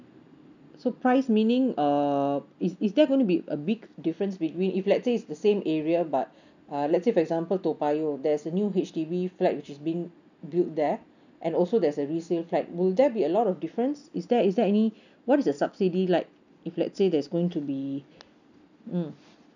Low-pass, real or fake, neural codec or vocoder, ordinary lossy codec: 7.2 kHz; real; none; none